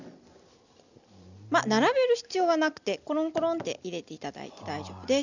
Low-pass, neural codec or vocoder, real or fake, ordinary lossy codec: 7.2 kHz; none; real; none